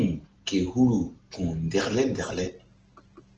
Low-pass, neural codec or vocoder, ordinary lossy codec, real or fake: 7.2 kHz; none; Opus, 16 kbps; real